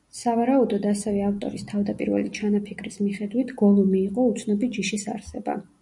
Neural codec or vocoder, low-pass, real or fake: none; 10.8 kHz; real